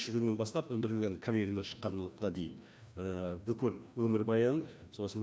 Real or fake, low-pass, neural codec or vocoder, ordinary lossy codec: fake; none; codec, 16 kHz, 1 kbps, FreqCodec, larger model; none